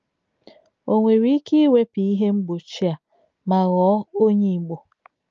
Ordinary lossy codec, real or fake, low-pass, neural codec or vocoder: Opus, 24 kbps; real; 7.2 kHz; none